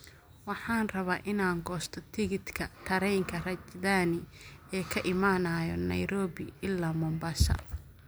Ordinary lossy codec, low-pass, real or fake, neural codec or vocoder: none; none; real; none